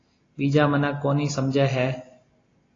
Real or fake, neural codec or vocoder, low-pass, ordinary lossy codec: real; none; 7.2 kHz; AAC, 32 kbps